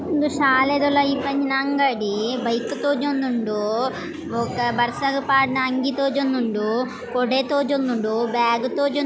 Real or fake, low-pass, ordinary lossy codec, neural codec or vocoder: real; none; none; none